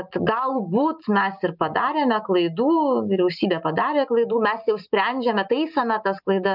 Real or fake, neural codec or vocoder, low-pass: real; none; 5.4 kHz